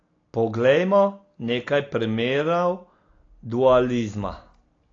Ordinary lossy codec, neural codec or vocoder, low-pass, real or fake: AAC, 32 kbps; none; 7.2 kHz; real